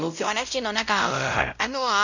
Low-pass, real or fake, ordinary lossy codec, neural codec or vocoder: 7.2 kHz; fake; none; codec, 16 kHz, 0.5 kbps, X-Codec, WavLM features, trained on Multilingual LibriSpeech